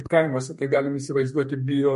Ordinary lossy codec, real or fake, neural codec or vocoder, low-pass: MP3, 48 kbps; fake; codec, 32 kHz, 1.9 kbps, SNAC; 14.4 kHz